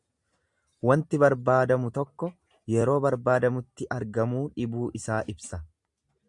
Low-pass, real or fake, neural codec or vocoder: 10.8 kHz; real; none